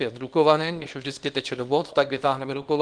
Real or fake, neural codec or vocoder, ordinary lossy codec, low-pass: fake; codec, 24 kHz, 0.9 kbps, WavTokenizer, small release; Opus, 24 kbps; 9.9 kHz